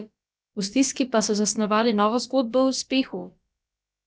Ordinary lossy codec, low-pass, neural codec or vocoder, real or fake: none; none; codec, 16 kHz, about 1 kbps, DyCAST, with the encoder's durations; fake